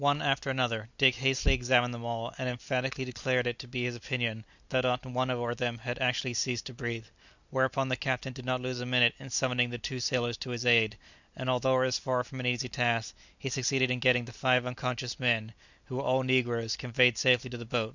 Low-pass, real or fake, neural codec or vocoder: 7.2 kHz; real; none